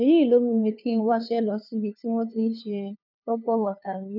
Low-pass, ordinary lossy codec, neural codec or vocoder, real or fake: 5.4 kHz; none; codec, 16 kHz, 2 kbps, FunCodec, trained on LibriTTS, 25 frames a second; fake